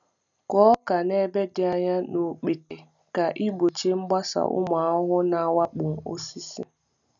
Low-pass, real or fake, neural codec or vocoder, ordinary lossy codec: 7.2 kHz; real; none; none